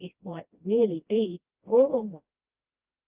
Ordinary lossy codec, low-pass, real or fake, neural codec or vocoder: Opus, 32 kbps; 3.6 kHz; fake; codec, 16 kHz, 1 kbps, FreqCodec, smaller model